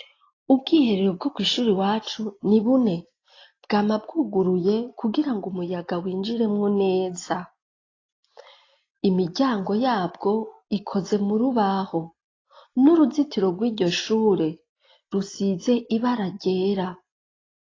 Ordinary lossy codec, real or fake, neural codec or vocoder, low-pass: AAC, 32 kbps; real; none; 7.2 kHz